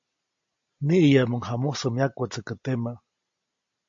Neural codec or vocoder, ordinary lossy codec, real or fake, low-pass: none; AAC, 48 kbps; real; 7.2 kHz